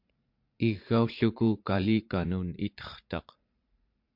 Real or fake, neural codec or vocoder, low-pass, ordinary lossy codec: fake; vocoder, 44.1 kHz, 80 mel bands, Vocos; 5.4 kHz; MP3, 48 kbps